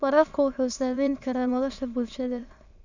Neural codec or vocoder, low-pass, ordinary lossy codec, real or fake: autoencoder, 22.05 kHz, a latent of 192 numbers a frame, VITS, trained on many speakers; 7.2 kHz; none; fake